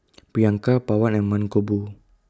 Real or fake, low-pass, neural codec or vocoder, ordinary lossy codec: real; none; none; none